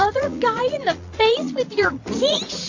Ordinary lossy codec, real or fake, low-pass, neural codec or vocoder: AAC, 48 kbps; fake; 7.2 kHz; vocoder, 44.1 kHz, 80 mel bands, Vocos